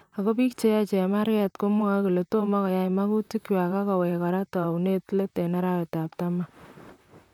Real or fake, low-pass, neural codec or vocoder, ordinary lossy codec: fake; 19.8 kHz; vocoder, 44.1 kHz, 128 mel bands, Pupu-Vocoder; none